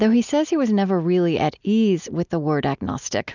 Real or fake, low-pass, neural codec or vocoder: real; 7.2 kHz; none